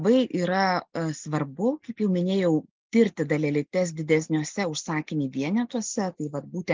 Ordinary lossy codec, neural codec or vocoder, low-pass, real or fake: Opus, 32 kbps; none; 7.2 kHz; real